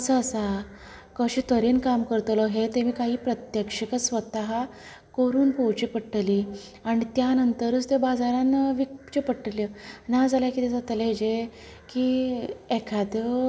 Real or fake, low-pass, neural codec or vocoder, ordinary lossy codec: real; none; none; none